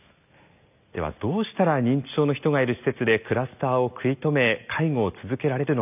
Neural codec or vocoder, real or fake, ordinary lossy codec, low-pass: none; real; none; 3.6 kHz